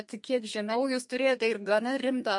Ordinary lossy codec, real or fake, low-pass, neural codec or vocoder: MP3, 48 kbps; fake; 10.8 kHz; codec, 32 kHz, 1.9 kbps, SNAC